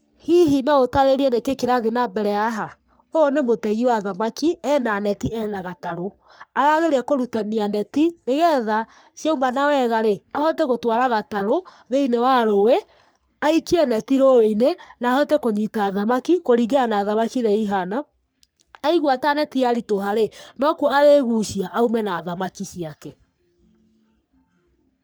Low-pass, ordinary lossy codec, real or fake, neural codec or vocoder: none; none; fake; codec, 44.1 kHz, 3.4 kbps, Pupu-Codec